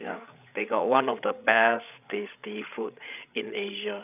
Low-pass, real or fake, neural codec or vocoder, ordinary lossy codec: 3.6 kHz; fake; codec, 16 kHz, 8 kbps, FreqCodec, larger model; none